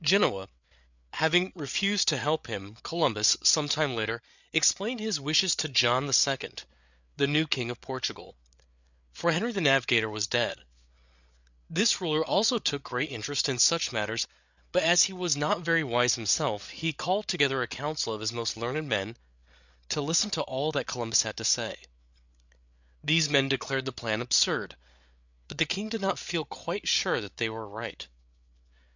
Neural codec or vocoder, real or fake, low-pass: codec, 16 kHz, 8 kbps, FreqCodec, larger model; fake; 7.2 kHz